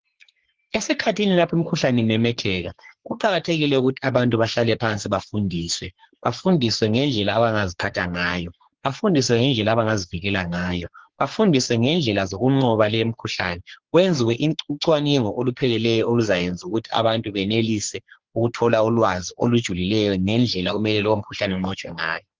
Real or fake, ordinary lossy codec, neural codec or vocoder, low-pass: fake; Opus, 16 kbps; codec, 44.1 kHz, 3.4 kbps, Pupu-Codec; 7.2 kHz